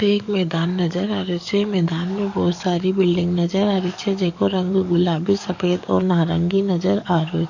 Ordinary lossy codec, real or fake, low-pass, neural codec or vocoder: none; fake; 7.2 kHz; codec, 44.1 kHz, 7.8 kbps, DAC